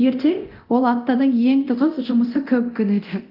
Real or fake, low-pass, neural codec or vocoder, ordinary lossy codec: fake; 5.4 kHz; codec, 24 kHz, 0.9 kbps, DualCodec; Opus, 32 kbps